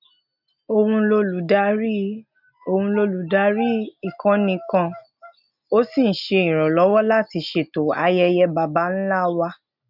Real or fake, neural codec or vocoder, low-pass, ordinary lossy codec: real; none; 5.4 kHz; none